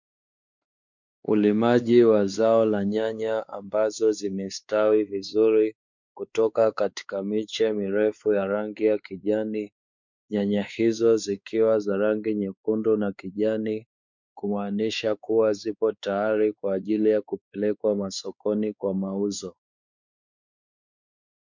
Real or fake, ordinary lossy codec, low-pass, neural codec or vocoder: fake; MP3, 64 kbps; 7.2 kHz; codec, 16 kHz, 4 kbps, X-Codec, WavLM features, trained on Multilingual LibriSpeech